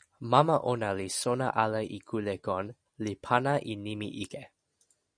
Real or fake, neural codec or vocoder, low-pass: real; none; 9.9 kHz